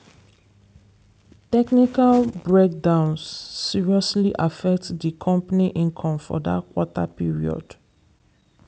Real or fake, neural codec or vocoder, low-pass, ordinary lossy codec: real; none; none; none